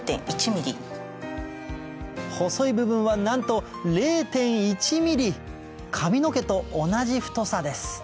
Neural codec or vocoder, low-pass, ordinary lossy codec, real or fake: none; none; none; real